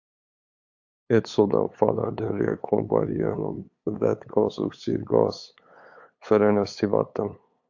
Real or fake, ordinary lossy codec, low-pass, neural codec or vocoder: fake; AAC, 48 kbps; 7.2 kHz; codec, 16 kHz, 8 kbps, FunCodec, trained on LibriTTS, 25 frames a second